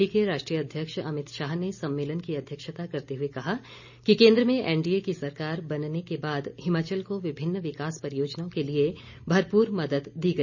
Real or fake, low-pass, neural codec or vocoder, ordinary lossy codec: real; 7.2 kHz; none; none